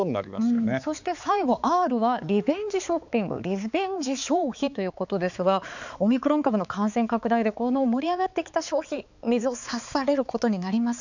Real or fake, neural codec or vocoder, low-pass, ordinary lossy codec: fake; codec, 16 kHz, 4 kbps, X-Codec, HuBERT features, trained on balanced general audio; 7.2 kHz; none